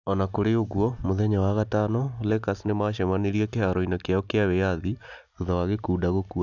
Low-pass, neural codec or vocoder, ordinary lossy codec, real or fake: 7.2 kHz; none; none; real